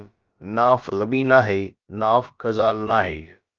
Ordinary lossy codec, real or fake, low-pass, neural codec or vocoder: Opus, 24 kbps; fake; 7.2 kHz; codec, 16 kHz, about 1 kbps, DyCAST, with the encoder's durations